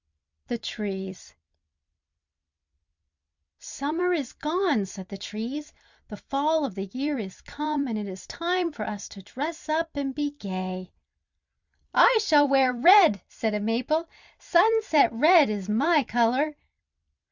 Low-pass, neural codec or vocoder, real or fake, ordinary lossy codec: 7.2 kHz; vocoder, 44.1 kHz, 128 mel bands every 256 samples, BigVGAN v2; fake; Opus, 64 kbps